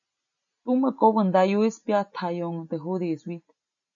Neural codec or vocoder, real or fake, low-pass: none; real; 7.2 kHz